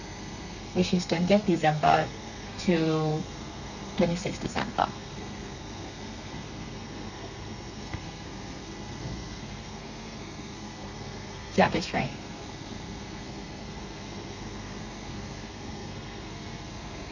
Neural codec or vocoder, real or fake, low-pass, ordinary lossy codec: codec, 32 kHz, 1.9 kbps, SNAC; fake; 7.2 kHz; none